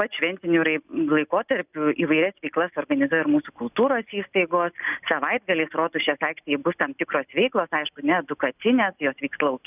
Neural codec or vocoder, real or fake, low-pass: none; real; 3.6 kHz